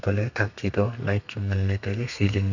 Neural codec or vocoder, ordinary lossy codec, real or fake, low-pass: codec, 32 kHz, 1.9 kbps, SNAC; none; fake; 7.2 kHz